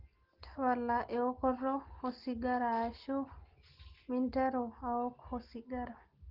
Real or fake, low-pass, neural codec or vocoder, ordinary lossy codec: real; 5.4 kHz; none; Opus, 16 kbps